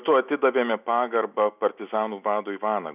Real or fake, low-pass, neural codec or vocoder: real; 3.6 kHz; none